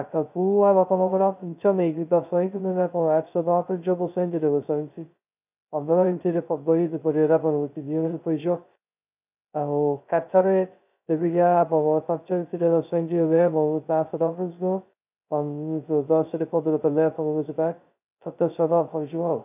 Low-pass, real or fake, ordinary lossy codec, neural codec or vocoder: 3.6 kHz; fake; none; codec, 16 kHz, 0.2 kbps, FocalCodec